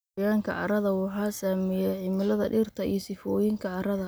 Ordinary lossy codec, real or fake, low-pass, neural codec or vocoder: none; real; none; none